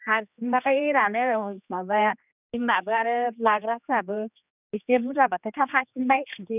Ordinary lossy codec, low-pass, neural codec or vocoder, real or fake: none; 3.6 kHz; codec, 16 kHz, 2 kbps, X-Codec, HuBERT features, trained on general audio; fake